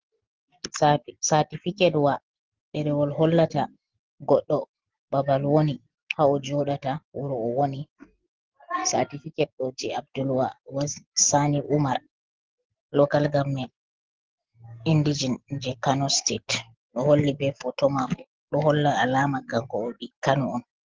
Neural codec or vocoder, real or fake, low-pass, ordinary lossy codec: none; real; 7.2 kHz; Opus, 16 kbps